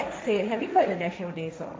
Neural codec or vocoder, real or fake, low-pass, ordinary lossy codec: codec, 16 kHz, 1.1 kbps, Voila-Tokenizer; fake; none; none